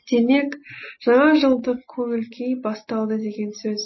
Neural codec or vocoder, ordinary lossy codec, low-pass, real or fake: none; MP3, 24 kbps; 7.2 kHz; real